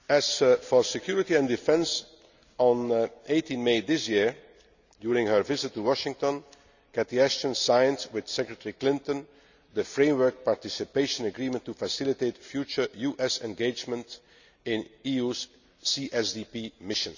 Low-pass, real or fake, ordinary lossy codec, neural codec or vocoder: 7.2 kHz; real; none; none